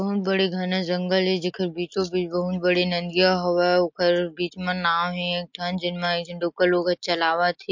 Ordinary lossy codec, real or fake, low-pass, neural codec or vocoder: MP3, 48 kbps; real; 7.2 kHz; none